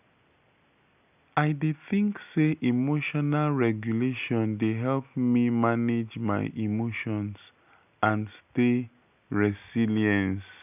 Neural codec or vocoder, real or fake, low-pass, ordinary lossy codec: none; real; 3.6 kHz; none